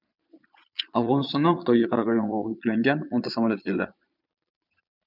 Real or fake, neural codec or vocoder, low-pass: fake; vocoder, 22.05 kHz, 80 mel bands, Vocos; 5.4 kHz